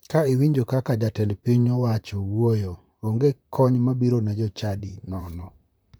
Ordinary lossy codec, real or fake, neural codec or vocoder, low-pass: none; fake; vocoder, 44.1 kHz, 128 mel bands, Pupu-Vocoder; none